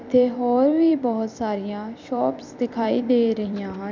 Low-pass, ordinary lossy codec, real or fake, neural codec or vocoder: 7.2 kHz; MP3, 64 kbps; fake; vocoder, 44.1 kHz, 128 mel bands every 256 samples, BigVGAN v2